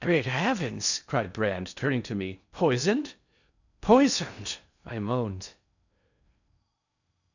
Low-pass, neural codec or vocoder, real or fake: 7.2 kHz; codec, 16 kHz in and 24 kHz out, 0.6 kbps, FocalCodec, streaming, 4096 codes; fake